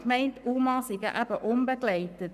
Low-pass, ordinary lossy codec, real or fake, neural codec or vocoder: 14.4 kHz; none; fake; codec, 44.1 kHz, 7.8 kbps, Pupu-Codec